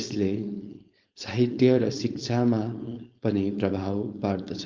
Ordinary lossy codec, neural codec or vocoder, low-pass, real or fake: Opus, 24 kbps; codec, 16 kHz, 4.8 kbps, FACodec; 7.2 kHz; fake